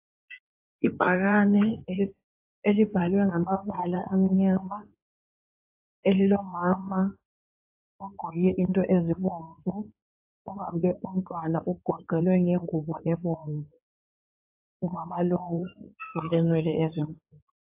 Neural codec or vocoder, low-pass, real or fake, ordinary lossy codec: codec, 16 kHz in and 24 kHz out, 2.2 kbps, FireRedTTS-2 codec; 3.6 kHz; fake; AAC, 32 kbps